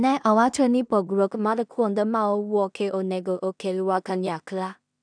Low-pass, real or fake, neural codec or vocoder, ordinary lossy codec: 9.9 kHz; fake; codec, 16 kHz in and 24 kHz out, 0.4 kbps, LongCat-Audio-Codec, two codebook decoder; none